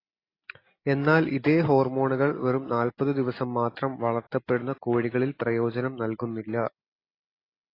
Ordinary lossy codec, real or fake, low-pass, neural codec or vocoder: AAC, 24 kbps; real; 5.4 kHz; none